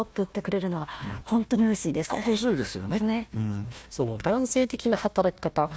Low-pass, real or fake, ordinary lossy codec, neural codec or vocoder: none; fake; none; codec, 16 kHz, 1 kbps, FunCodec, trained on Chinese and English, 50 frames a second